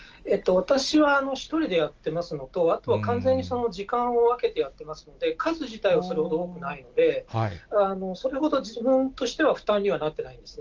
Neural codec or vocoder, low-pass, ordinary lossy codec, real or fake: none; 7.2 kHz; Opus, 24 kbps; real